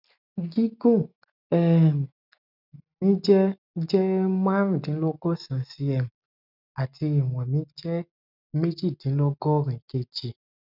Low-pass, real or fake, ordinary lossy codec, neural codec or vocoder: 5.4 kHz; real; none; none